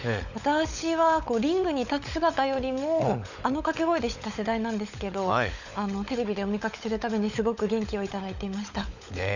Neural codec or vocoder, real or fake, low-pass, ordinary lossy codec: codec, 16 kHz, 16 kbps, FunCodec, trained on LibriTTS, 50 frames a second; fake; 7.2 kHz; none